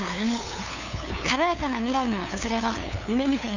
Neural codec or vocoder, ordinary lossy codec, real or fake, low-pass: codec, 16 kHz, 2 kbps, FunCodec, trained on LibriTTS, 25 frames a second; none; fake; 7.2 kHz